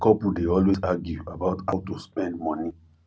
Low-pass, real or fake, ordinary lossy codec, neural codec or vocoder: none; real; none; none